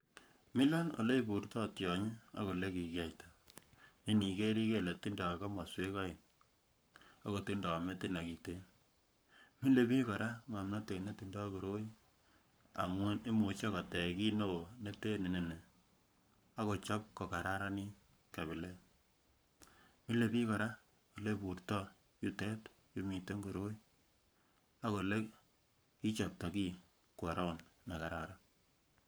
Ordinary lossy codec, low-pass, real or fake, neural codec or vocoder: none; none; fake; codec, 44.1 kHz, 7.8 kbps, Pupu-Codec